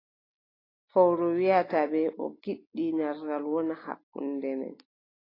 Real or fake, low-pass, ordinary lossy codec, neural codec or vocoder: real; 5.4 kHz; AAC, 24 kbps; none